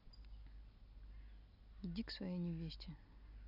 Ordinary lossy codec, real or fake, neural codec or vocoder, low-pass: none; real; none; 5.4 kHz